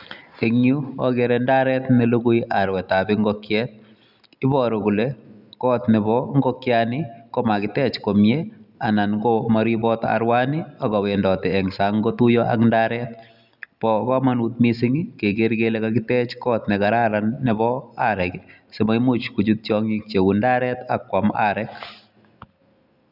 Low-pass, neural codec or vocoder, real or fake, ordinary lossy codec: 5.4 kHz; none; real; none